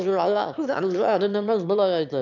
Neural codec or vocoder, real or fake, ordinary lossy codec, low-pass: autoencoder, 22.05 kHz, a latent of 192 numbers a frame, VITS, trained on one speaker; fake; none; 7.2 kHz